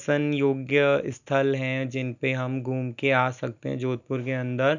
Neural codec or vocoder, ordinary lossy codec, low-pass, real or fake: none; none; 7.2 kHz; real